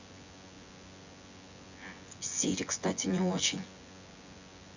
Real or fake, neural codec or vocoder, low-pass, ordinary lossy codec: fake; vocoder, 24 kHz, 100 mel bands, Vocos; 7.2 kHz; Opus, 64 kbps